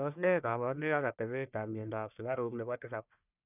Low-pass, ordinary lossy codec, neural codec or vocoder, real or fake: 3.6 kHz; none; codec, 44.1 kHz, 1.7 kbps, Pupu-Codec; fake